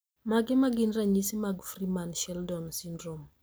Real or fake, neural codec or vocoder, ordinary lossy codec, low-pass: real; none; none; none